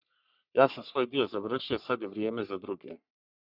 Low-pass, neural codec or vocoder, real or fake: 5.4 kHz; codec, 44.1 kHz, 3.4 kbps, Pupu-Codec; fake